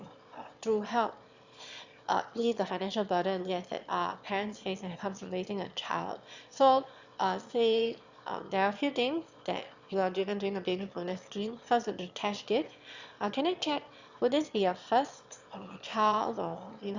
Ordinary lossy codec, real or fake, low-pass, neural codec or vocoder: Opus, 64 kbps; fake; 7.2 kHz; autoencoder, 22.05 kHz, a latent of 192 numbers a frame, VITS, trained on one speaker